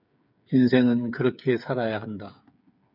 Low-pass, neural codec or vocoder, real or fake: 5.4 kHz; codec, 16 kHz, 8 kbps, FreqCodec, smaller model; fake